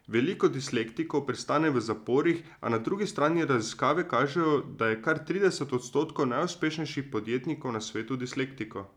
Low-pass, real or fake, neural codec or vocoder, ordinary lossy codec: 19.8 kHz; real; none; none